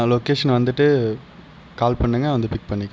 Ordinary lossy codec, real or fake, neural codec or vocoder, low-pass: none; real; none; none